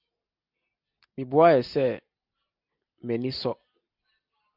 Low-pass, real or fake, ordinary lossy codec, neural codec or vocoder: 5.4 kHz; real; Opus, 64 kbps; none